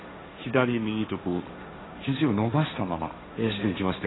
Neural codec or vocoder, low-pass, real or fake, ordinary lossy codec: codec, 16 kHz, 1.1 kbps, Voila-Tokenizer; 7.2 kHz; fake; AAC, 16 kbps